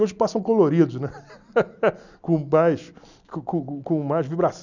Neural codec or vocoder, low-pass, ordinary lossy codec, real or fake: none; 7.2 kHz; none; real